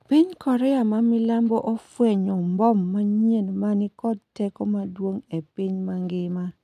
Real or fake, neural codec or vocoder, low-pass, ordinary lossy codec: real; none; 14.4 kHz; none